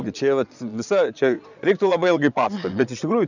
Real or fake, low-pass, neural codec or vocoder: fake; 7.2 kHz; vocoder, 22.05 kHz, 80 mel bands, Vocos